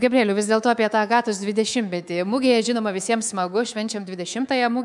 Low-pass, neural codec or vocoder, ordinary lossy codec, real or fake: 10.8 kHz; codec, 24 kHz, 3.1 kbps, DualCodec; MP3, 96 kbps; fake